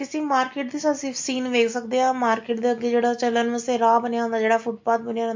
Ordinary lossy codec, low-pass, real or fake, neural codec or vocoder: MP3, 48 kbps; 7.2 kHz; real; none